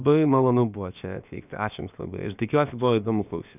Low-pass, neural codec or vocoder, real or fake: 3.6 kHz; codec, 16 kHz, about 1 kbps, DyCAST, with the encoder's durations; fake